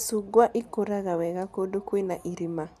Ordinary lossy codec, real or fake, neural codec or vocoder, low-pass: none; real; none; 14.4 kHz